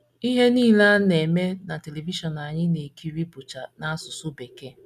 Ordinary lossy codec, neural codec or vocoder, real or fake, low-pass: none; none; real; 14.4 kHz